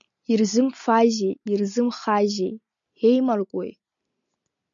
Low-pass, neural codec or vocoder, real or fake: 7.2 kHz; none; real